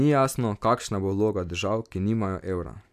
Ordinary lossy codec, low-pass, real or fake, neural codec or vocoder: none; 14.4 kHz; real; none